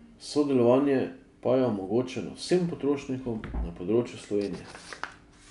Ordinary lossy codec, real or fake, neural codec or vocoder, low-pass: none; real; none; 10.8 kHz